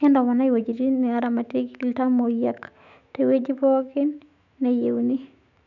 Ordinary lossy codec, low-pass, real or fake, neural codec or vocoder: none; 7.2 kHz; fake; autoencoder, 48 kHz, 128 numbers a frame, DAC-VAE, trained on Japanese speech